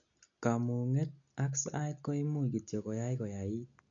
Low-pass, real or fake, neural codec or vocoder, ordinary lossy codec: 7.2 kHz; real; none; none